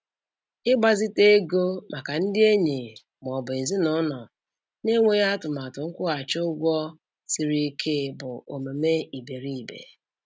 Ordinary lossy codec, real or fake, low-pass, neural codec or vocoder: none; real; none; none